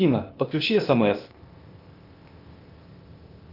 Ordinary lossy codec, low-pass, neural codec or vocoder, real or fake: Opus, 24 kbps; 5.4 kHz; codec, 16 kHz, 0.8 kbps, ZipCodec; fake